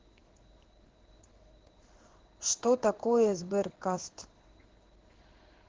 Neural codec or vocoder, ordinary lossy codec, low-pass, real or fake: codec, 16 kHz in and 24 kHz out, 1 kbps, XY-Tokenizer; Opus, 16 kbps; 7.2 kHz; fake